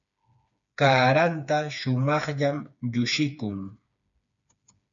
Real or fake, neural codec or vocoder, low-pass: fake; codec, 16 kHz, 4 kbps, FreqCodec, smaller model; 7.2 kHz